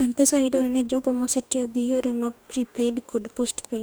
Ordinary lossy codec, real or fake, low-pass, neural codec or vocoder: none; fake; none; codec, 44.1 kHz, 2.6 kbps, DAC